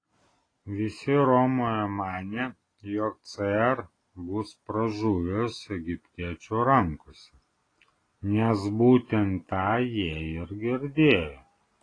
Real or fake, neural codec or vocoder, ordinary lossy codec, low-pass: real; none; AAC, 32 kbps; 9.9 kHz